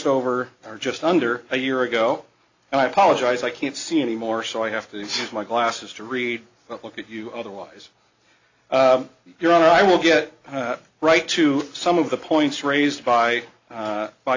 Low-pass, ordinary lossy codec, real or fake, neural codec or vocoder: 7.2 kHz; AAC, 48 kbps; real; none